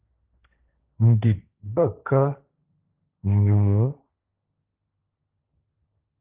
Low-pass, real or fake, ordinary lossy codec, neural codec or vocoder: 3.6 kHz; fake; Opus, 32 kbps; codec, 16 kHz, 1.1 kbps, Voila-Tokenizer